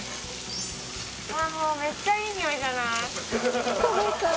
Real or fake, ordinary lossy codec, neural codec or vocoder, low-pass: real; none; none; none